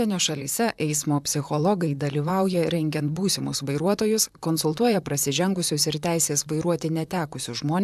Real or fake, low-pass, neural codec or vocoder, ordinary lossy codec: fake; 14.4 kHz; vocoder, 44.1 kHz, 128 mel bands, Pupu-Vocoder; AAC, 96 kbps